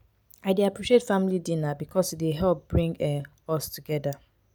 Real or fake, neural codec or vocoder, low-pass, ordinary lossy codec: real; none; none; none